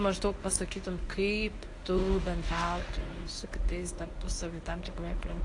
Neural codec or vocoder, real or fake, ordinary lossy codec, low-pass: codec, 24 kHz, 0.9 kbps, WavTokenizer, medium speech release version 1; fake; AAC, 48 kbps; 10.8 kHz